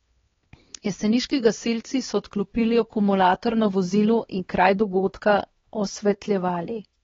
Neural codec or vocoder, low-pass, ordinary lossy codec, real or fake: codec, 16 kHz, 2 kbps, X-Codec, HuBERT features, trained on LibriSpeech; 7.2 kHz; AAC, 24 kbps; fake